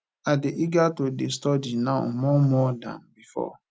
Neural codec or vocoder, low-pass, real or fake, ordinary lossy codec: none; none; real; none